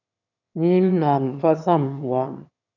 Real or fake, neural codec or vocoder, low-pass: fake; autoencoder, 22.05 kHz, a latent of 192 numbers a frame, VITS, trained on one speaker; 7.2 kHz